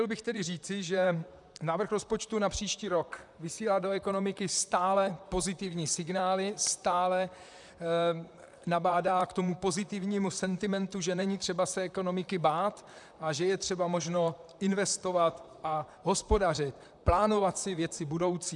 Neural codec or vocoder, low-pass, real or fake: vocoder, 44.1 kHz, 128 mel bands, Pupu-Vocoder; 10.8 kHz; fake